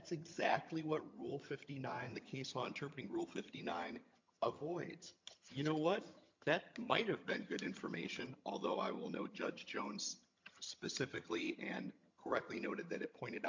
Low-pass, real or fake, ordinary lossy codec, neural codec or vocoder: 7.2 kHz; fake; MP3, 48 kbps; vocoder, 22.05 kHz, 80 mel bands, HiFi-GAN